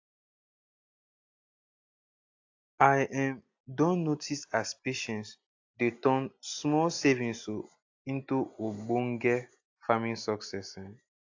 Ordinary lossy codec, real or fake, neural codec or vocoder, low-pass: AAC, 48 kbps; real; none; 7.2 kHz